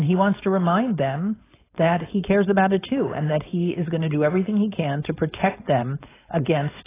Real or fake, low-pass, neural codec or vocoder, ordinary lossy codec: fake; 3.6 kHz; codec, 16 kHz, 4.8 kbps, FACodec; AAC, 16 kbps